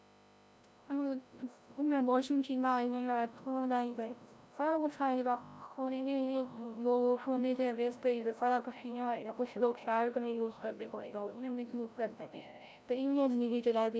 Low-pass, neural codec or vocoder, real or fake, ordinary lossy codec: none; codec, 16 kHz, 0.5 kbps, FreqCodec, larger model; fake; none